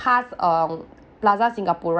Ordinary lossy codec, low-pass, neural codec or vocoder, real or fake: none; none; none; real